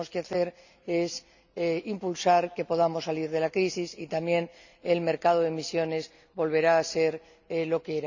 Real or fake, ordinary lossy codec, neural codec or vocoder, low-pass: real; none; none; 7.2 kHz